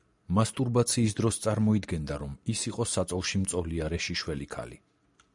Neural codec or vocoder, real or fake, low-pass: none; real; 10.8 kHz